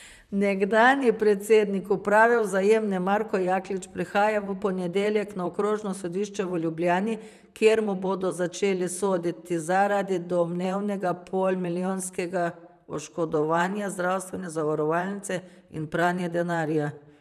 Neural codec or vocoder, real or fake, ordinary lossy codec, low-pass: vocoder, 44.1 kHz, 128 mel bands, Pupu-Vocoder; fake; none; 14.4 kHz